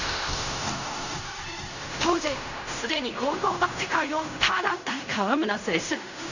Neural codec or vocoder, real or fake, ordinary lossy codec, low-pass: codec, 16 kHz in and 24 kHz out, 0.4 kbps, LongCat-Audio-Codec, fine tuned four codebook decoder; fake; none; 7.2 kHz